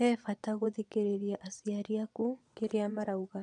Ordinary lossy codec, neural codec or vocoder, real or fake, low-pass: none; vocoder, 22.05 kHz, 80 mel bands, Vocos; fake; 9.9 kHz